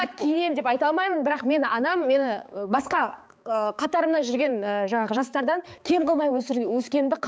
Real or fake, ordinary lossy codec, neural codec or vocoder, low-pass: fake; none; codec, 16 kHz, 4 kbps, X-Codec, HuBERT features, trained on balanced general audio; none